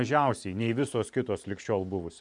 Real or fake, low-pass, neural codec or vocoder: fake; 10.8 kHz; vocoder, 44.1 kHz, 128 mel bands every 512 samples, BigVGAN v2